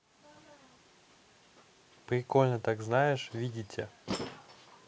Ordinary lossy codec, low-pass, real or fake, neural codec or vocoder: none; none; real; none